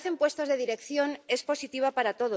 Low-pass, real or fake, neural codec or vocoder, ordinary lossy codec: none; real; none; none